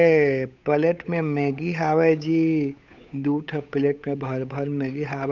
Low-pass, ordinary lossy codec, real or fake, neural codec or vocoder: 7.2 kHz; none; fake; codec, 16 kHz, 8 kbps, FunCodec, trained on Chinese and English, 25 frames a second